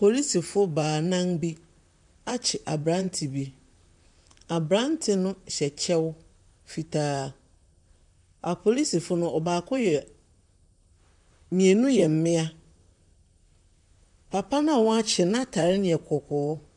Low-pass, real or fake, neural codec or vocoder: 10.8 kHz; fake; vocoder, 44.1 kHz, 128 mel bands, Pupu-Vocoder